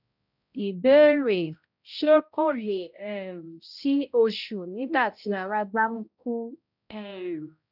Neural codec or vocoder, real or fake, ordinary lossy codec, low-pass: codec, 16 kHz, 0.5 kbps, X-Codec, HuBERT features, trained on balanced general audio; fake; none; 5.4 kHz